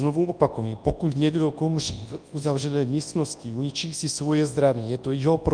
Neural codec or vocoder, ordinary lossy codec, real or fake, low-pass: codec, 24 kHz, 0.9 kbps, WavTokenizer, large speech release; Opus, 32 kbps; fake; 9.9 kHz